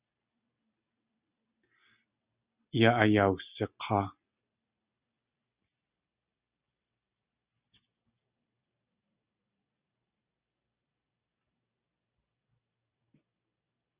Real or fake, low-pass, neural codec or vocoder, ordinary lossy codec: real; 3.6 kHz; none; Opus, 64 kbps